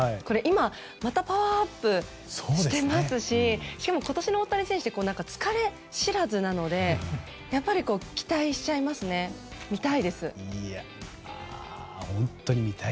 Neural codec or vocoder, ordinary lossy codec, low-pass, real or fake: none; none; none; real